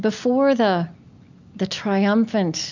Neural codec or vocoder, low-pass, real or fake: none; 7.2 kHz; real